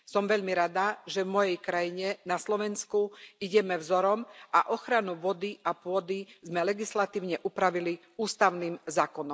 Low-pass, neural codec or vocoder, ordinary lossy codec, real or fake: none; none; none; real